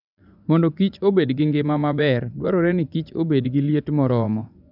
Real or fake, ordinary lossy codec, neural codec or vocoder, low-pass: fake; none; vocoder, 24 kHz, 100 mel bands, Vocos; 5.4 kHz